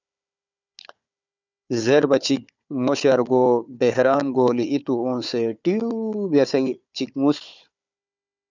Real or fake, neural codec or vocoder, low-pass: fake; codec, 16 kHz, 4 kbps, FunCodec, trained on Chinese and English, 50 frames a second; 7.2 kHz